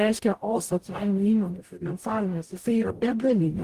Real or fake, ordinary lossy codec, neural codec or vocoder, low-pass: fake; Opus, 24 kbps; codec, 44.1 kHz, 0.9 kbps, DAC; 14.4 kHz